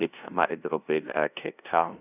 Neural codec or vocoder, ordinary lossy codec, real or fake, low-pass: codec, 16 kHz, 0.5 kbps, FunCodec, trained on LibriTTS, 25 frames a second; none; fake; 3.6 kHz